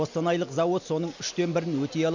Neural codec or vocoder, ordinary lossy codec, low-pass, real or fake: none; none; 7.2 kHz; real